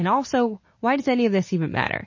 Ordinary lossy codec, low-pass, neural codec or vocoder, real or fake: MP3, 32 kbps; 7.2 kHz; none; real